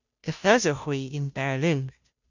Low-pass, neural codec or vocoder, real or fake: 7.2 kHz; codec, 16 kHz, 0.5 kbps, FunCodec, trained on Chinese and English, 25 frames a second; fake